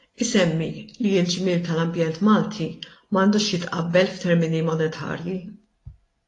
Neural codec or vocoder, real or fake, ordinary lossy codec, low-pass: none; real; AAC, 32 kbps; 10.8 kHz